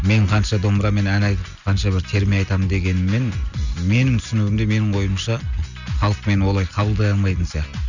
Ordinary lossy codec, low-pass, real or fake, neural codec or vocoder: none; 7.2 kHz; real; none